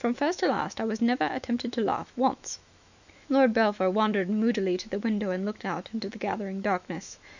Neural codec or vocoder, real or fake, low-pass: autoencoder, 48 kHz, 128 numbers a frame, DAC-VAE, trained on Japanese speech; fake; 7.2 kHz